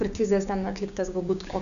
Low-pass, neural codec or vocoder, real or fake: 7.2 kHz; codec, 16 kHz, 6 kbps, DAC; fake